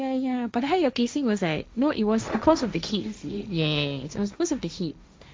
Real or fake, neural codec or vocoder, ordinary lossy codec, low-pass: fake; codec, 16 kHz, 1.1 kbps, Voila-Tokenizer; none; none